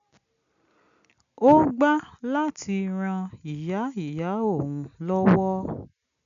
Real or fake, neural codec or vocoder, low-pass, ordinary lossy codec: real; none; 7.2 kHz; none